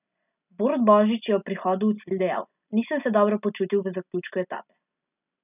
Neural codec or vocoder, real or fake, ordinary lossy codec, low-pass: none; real; none; 3.6 kHz